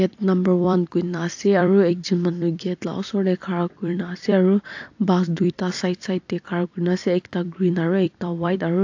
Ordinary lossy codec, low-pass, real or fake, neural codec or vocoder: AAC, 48 kbps; 7.2 kHz; fake; vocoder, 44.1 kHz, 128 mel bands every 256 samples, BigVGAN v2